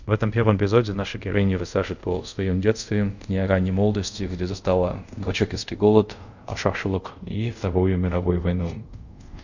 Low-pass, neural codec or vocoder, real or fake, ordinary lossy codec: 7.2 kHz; codec, 24 kHz, 0.5 kbps, DualCodec; fake; none